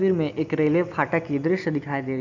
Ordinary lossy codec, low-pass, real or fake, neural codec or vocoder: none; 7.2 kHz; real; none